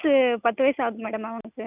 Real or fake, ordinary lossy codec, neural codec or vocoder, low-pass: real; none; none; 3.6 kHz